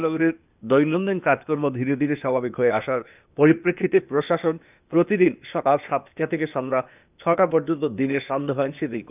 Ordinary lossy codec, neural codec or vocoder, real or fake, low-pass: none; codec, 16 kHz, 0.8 kbps, ZipCodec; fake; 3.6 kHz